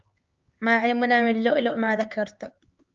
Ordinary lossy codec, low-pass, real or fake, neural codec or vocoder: Opus, 24 kbps; 7.2 kHz; fake; codec, 16 kHz, 4 kbps, X-Codec, HuBERT features, trained on LibriSpeech